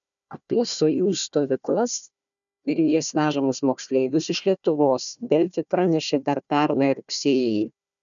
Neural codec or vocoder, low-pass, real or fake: codec, 16 kHz, 1 kbps, FunCodec, trained on Chinese and English, 50 frames a second; 7.2 kHz; fake